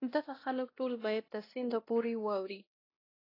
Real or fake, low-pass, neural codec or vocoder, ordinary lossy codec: fake; 5.4 kHz; codec, 16 kHz, 1 kbps, X-Codec, WavLM features, trained on Multilingual LibriSpeech; AAC, 24 kbps